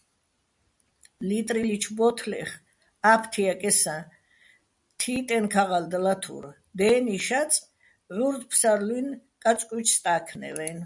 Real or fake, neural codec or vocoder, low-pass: real; none; 10.8 kHz